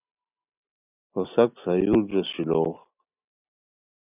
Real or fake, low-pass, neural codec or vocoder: real; 3.6 kHz; none